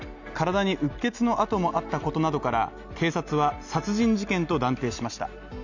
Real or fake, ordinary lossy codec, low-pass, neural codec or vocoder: real; none; 7.2 kHz; none